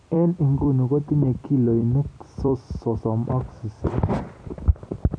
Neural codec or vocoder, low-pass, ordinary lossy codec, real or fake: vocoder, 44.1 kHz, 128 mel bands every 256 samples, BigVGAN v2; 9.9 kHz; none; fake